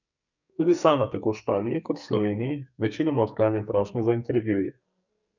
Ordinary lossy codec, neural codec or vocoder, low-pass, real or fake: none; codec, 44.1 kHz, 2.6 kbps, SNAC; 7.2 kHz; fake